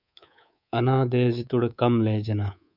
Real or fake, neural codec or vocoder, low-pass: fake; codec, 24 kHz, 3.1 kbps, DualCodec; 5.4 kHz